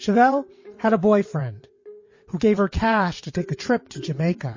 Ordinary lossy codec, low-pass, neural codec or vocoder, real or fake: MP3, 32 kbps; 7.2 kHz; vocoder, 22.05 kHz, 80 mel bands, WaveNeXt; fake